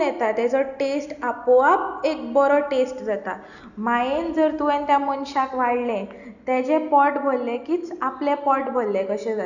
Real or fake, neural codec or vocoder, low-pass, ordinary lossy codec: real; none; 7.2 kHz; none